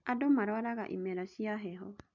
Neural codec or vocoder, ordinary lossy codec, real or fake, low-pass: none; MP3, 64 kbps; real; 7.2 kHz